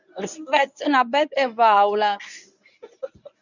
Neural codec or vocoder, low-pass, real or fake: codec, 24 kHz, 0.9 kbps, WavTokenizer, medium speech release version 1; 7.2 kHz; fake